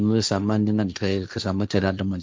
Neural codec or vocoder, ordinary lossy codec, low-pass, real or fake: codec, 16 kHz, 1.1 kbps, Voila-Tokenizer; none; none; fake